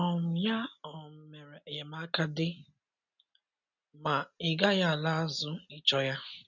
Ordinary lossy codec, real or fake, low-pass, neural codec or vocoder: none; real; 7.2 kHz; none